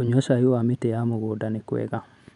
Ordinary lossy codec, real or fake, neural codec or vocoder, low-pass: none; real; none; 10.8 kHz